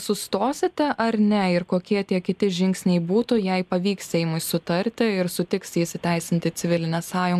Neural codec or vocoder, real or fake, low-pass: none; real; 14.4 kHz